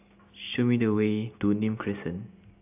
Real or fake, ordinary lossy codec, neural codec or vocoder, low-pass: real; AAC, 32 kbps; none; 3.6 kHz